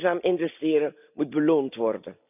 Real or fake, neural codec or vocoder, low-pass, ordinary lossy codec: real; none; 3.6 kHz; none